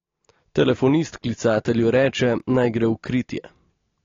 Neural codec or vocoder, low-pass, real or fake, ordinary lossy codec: none; 7.2 kHz; real; AAC, 32 kbps